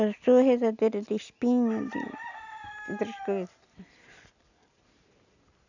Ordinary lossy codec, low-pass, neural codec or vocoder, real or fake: none; 7.2 kHz; none; real